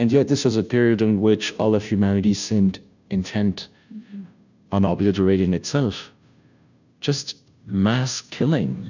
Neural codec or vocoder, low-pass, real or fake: codec, 16 kHz, 0.5 kbps, FunCodec, trained on Chinese and English, 25 frames a second; 7.2 kHz; fake